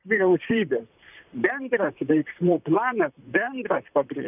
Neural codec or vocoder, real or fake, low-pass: vocoder, 44.1 kHz, 128 mel bands, Pupu-Vocoder; fake; 3.6 kHz